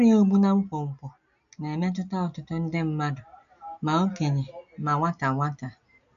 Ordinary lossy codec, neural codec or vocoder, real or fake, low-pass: none; none; real; 7.2 kHz